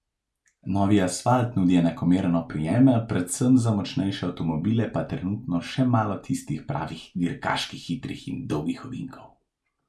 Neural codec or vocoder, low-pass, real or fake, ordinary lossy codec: none; none; real; none